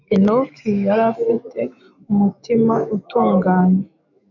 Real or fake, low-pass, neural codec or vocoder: fake; 7.2 kHz; autoencoder, 48 kHz, 128 numbers a frame, DAC-VAE, trained on Japanese speech